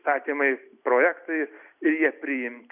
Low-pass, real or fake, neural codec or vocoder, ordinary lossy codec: 3.6 kHz; real; none; Opus, 64 kbps